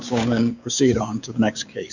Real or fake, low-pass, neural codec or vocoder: fake; 7.2 kHz; codec, 16 kHz, 6 kbps, DAC